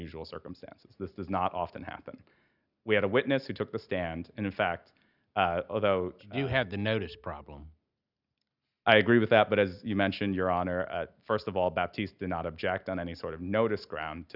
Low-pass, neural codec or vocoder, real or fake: 5.4 kHz; none; real